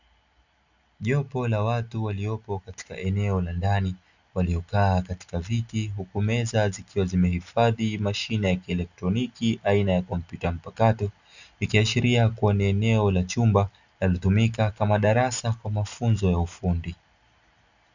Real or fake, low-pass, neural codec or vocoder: real; 7.2 kHz; none